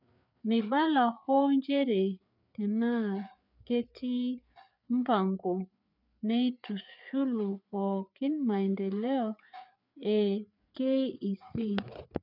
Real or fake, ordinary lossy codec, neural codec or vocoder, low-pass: fake; none; codec, 16 kHz, 4 kbps, FreqCodec, larger model; 5.4 kHz